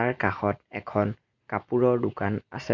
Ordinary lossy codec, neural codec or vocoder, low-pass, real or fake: MP3, 48 kbps; none; 7.2 kHz; real